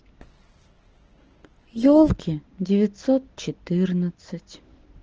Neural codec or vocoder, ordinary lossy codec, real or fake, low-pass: none; Opus, 16 kbps; real; 7.2 kHz